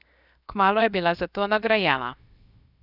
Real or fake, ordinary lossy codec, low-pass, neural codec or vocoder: fake; none; 5.4 kHz; codec, 16 kHz, 0.7 kbps, FocalCodec